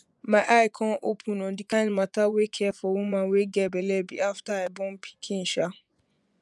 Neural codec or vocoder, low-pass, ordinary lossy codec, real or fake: vocoder, 24 kHz, 100 mel bands, Vocos; none; none; fake